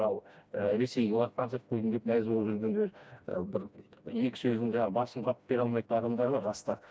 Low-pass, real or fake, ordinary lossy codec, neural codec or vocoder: none; fake; none; codec, 16 kHz, 1 kbps, FreqCodec, smaller model